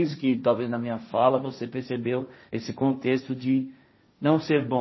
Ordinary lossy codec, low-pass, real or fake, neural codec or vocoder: MP3, 24 kbps; 7.2 kHz; fake; codec, 16 kHz, 1.1 kbps, Voila-Tokenizer